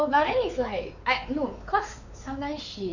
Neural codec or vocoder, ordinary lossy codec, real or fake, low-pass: codec, 16 kHz, 4 kbps, X-Codec, WavLM features, trained on Multilingual LibriSpeech; none; fake; 7.2 kHz